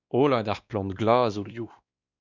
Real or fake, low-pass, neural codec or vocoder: fake; 7.2 kHz; codec, 16 kHz, 2 kbps, X-Codec, WavLM features, trained on Multilingual LibriSpeech